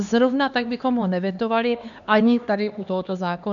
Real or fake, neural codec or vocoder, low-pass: fake; codec, 16 kHz, 2 kbps, X-Codec, HuBERT features, trained on LibriSpeech; 7.2 kHz